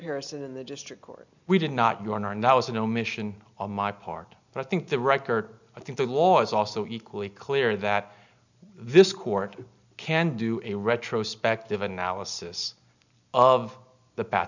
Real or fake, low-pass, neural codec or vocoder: real; 7.2 kHz; none